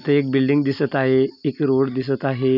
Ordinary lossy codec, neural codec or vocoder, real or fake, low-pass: none; none; real; 5.4 kHz